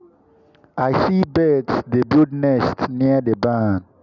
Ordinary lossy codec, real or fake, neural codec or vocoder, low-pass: none; real; none; 7.2 kHz